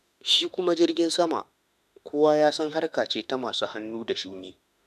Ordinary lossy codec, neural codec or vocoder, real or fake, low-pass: none; autoencoder, 48 kHz, 32 numbers a frame, DAC-VAE, trained on Japanese speech; fake; 14.4 kHz